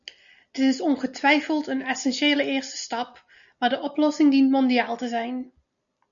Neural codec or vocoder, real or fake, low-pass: none; real; 7.2 kHz